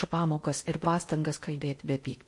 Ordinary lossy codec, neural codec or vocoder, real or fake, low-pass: MP3, 48 kbps; codec, 16 kHz in and 24 kHz out, 0.6 kbps, FocalCodec, streaming, 4096 codes; fake; 10.8 kHz